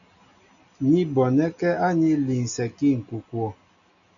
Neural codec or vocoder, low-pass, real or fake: none; 7.2 kHz; real